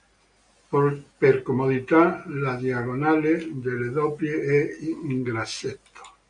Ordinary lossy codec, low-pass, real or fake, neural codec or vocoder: MP3, 96 kbps; 9.9 kHz; real; none